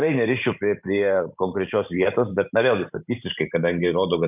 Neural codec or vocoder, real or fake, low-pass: vocoder, 44.1 kHz, 128 mel bands every 512 samples, BigVGAN v2; fake; 3.6 kHz